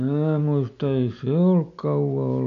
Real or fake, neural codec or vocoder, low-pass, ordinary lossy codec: real; none; 7.2 kHz; AAC, 96 kbps